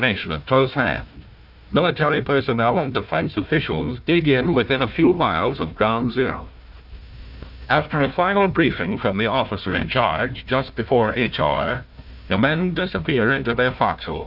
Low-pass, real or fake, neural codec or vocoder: 5.4 kHz; fake; codec, 16 kHz, 1 kbps, FunCodec, trained on Chinese and English, 50 frames a second